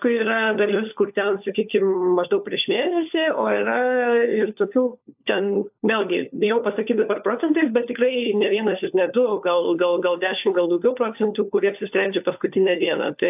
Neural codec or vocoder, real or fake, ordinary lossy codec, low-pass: codec, 16 kHz, 4 kbps, FunCodec, trained on LibriTTS, 50 frames a second; fake; AAC, 32 kbps; 3.6 kHz